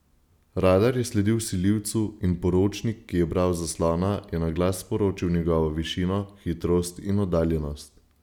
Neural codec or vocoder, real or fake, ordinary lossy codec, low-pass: none; real; none; 19.8 kHz